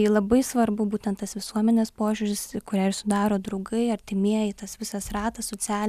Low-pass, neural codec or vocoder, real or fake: 14.4 kHz; none; real